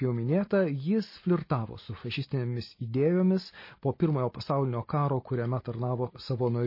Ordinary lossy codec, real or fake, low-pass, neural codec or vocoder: MP3, 24 kbps; real; 5.4 kHz; none